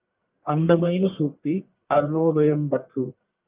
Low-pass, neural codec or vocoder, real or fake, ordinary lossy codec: 3.6 kHz; codec, 44.1 kHz, 1.7 kbps, Pupu-Codec; fake; Opus, 24 kbps